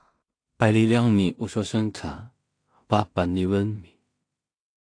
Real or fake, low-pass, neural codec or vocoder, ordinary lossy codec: fake; 9.9 kHz; codec, 16 kHz in and 24 kHz out, 0.4 kbps, LongCat-Audio-Codec, two codebook decoder; AAC, 48 kbps